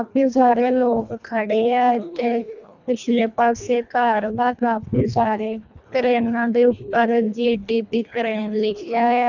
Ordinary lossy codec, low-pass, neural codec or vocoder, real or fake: none; 7.2 kHz; codec, 24 kHz, 1.5 kbps, HILCodec; fake